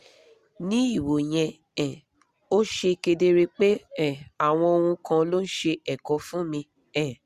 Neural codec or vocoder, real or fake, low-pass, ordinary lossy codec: none; real; 14.4 kHz; Opus, 64 kbps